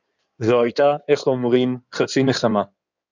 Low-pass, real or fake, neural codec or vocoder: 7.2 kHz; fake; codec, 16 kHz in and 24 kHz out, 2.2 kbps, FireRedTTS-2 codec